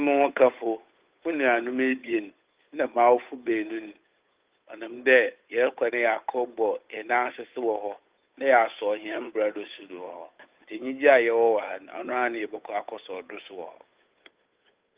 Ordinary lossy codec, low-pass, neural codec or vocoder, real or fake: Opus, 32 kbps; 3.6 kHz; codec, 16 kHz, 8 kbps, FunCodec, trained on Chinese and English, 25 frames a second; fake